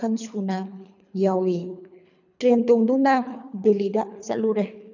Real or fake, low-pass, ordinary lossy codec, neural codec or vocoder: fake; 7.2 kHz; none; codec, 24 kHz, 3 kbps, HILCodec